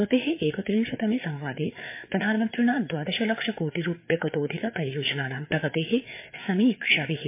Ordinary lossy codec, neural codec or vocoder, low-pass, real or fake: MP3, 24 kbps; codec, 16 kHz, 4 kbps, FreqCodec, larger model; 3.6 kHz; fake